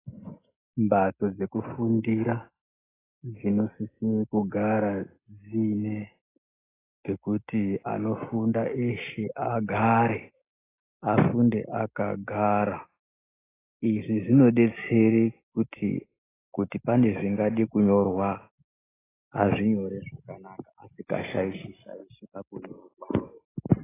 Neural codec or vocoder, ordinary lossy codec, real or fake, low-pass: none; AAC, 16 kbps; real; 3.6 kHz